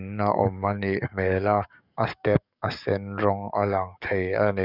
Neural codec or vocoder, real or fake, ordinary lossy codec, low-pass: codec, 44.1 kHz, 7.8 kbps, DAC; fake; none; 5.4 kHz